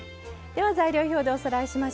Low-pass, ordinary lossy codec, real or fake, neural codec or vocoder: none; none; real; none